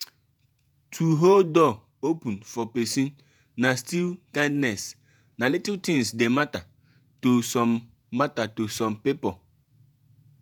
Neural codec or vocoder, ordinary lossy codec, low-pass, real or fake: vocoder, 48 kHz, 128 mel bands, Vocos; none; none; fake